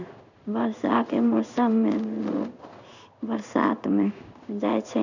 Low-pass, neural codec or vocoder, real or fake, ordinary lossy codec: 7.2 kHz; codec, 16 kHz in and 24 kHz out, 1 kbps, XY-Tokenizer; fake; none